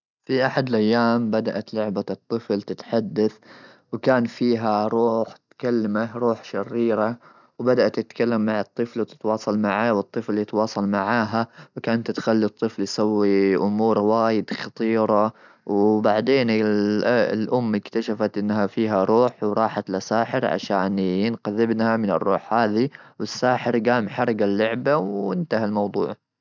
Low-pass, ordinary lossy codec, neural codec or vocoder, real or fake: 7.2 kHz; none; none; real